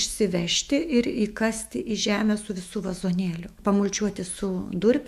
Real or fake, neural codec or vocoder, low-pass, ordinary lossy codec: fake; autoencoder, 48 kHz, 128 numbers a frame, DAC-VAE, trained on Japanese speech; 14.4 kHz; AAC, 96 kbps